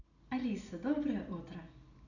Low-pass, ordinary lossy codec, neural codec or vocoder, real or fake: 7.2 kHz; none; none; real